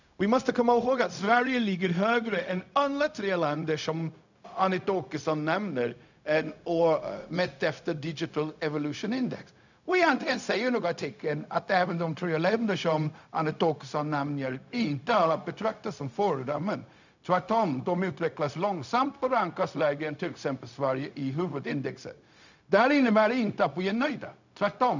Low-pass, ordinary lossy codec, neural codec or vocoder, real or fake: 7.2 kHz; none; codec, 16 kHz, 0.4 kbps, LongCat-Audio-Codec; fake